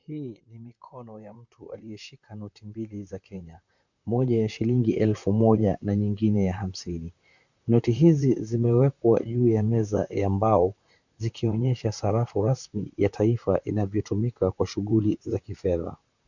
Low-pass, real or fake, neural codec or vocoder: 7.2 kHz; fake; vocoder, 44.1 kHz, 128 mel bands, Pupu-Vocoder